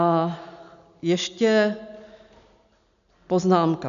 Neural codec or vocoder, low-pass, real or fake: none; 7.2 kHz; real